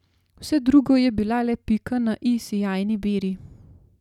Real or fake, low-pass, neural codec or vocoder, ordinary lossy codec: real; 19.8 kHz; none; none